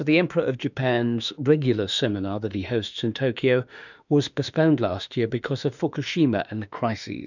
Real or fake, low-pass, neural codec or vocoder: fake; 7.2 kHz; autoencoder, 48 kHz, 32 numbers a frame, DAC-VAE, trained on Japanese speech